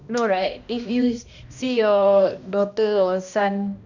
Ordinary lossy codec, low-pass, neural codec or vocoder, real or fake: none; 7.2 kHz; codec, 16 kHz, 1 kbps, X-Codec, HuBERT features, trained on balanced general audio; fake